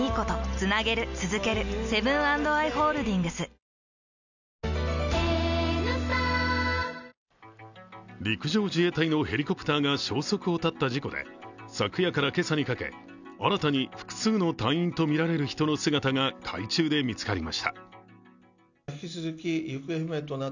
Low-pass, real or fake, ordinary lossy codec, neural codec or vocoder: 7.2 kHz; real; none; none